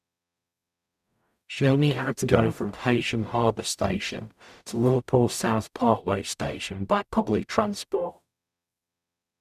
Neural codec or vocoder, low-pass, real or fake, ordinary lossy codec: codec, 44.1 kHz, 0.9 kbps, DAC; 14.4 kHz; fake; none